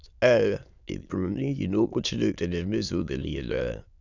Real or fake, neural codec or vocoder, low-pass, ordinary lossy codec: fake; autoencoder, 22.05 kHz, a latent of 192 numbers a frame, VITS, trained on many speakers; 7.2 kHz; none